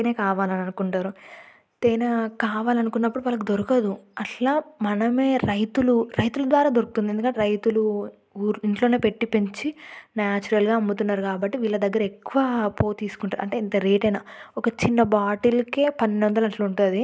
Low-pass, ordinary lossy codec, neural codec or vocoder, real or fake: none; none; none; real